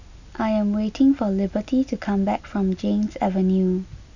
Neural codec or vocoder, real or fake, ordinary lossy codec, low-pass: none; real; none; 7.2 kHz